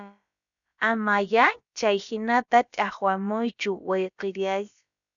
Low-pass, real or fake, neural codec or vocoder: 7.2 kHz; fake; codec, 16 kHz, about 1 kbps, DyCAST, with the encoder's durations